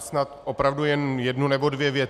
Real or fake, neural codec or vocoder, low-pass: real; none; 14.4 kHz